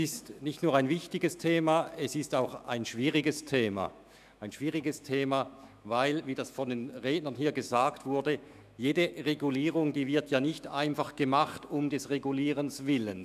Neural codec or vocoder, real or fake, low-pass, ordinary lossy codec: autoencoder, 48 kHz, 128 numbers a frame, DAC-VAE, trained on Japanese speech; fake; 14.4 kHz; none